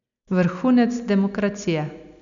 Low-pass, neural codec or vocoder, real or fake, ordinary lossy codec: 7.2 kHz; none; real; none